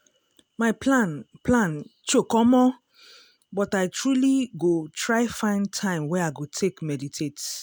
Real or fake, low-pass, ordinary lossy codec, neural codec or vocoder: real; none; none; none